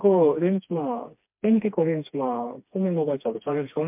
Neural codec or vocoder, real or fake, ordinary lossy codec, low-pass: codec, 16 kHz, 2 kbps, FreqCodec, smaller model; fake; MP3, 32 kbps; 3.6 kHz